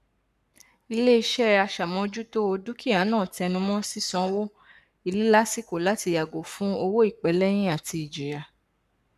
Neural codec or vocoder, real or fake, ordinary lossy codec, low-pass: codec, 44.1 kHz, 7.8 kbps, Pupu-Codec; fake; none; 14.4 kHz